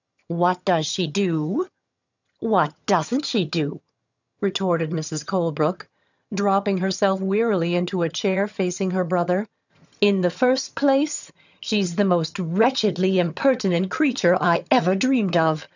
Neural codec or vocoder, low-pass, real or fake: vocoder, 22.05 kHz, 80 mel bands, HiFi-GAN; 7.2 kHz; fake